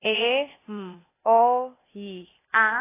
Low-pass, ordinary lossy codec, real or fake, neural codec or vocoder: 3.6 kHz; AAC, 16 kbps; fake; codec, 16 kHz, 0.8 kbps, ZipCodec